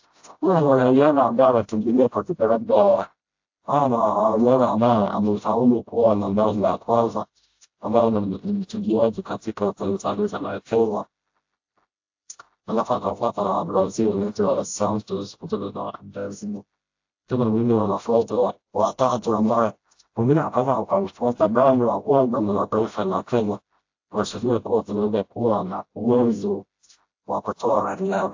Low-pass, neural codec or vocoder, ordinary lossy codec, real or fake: 7.2 kHz; codec, 16 kHz, 0.5 kbps, FreqCodec, smaller model; AAC, 48 kbps; fake